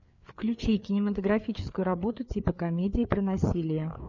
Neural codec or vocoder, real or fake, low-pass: codec, 16 kHz, 4 kbps, FreqCodec, larger model; fake; 7.2 kHz